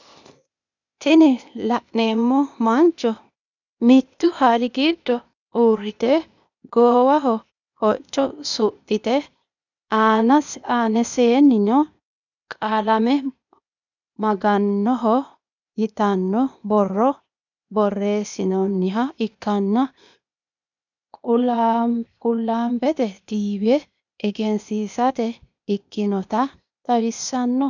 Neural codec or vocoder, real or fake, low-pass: codec, 16 kHz, 0.8 kbps, ZipCodec; fake; 7.2 kHz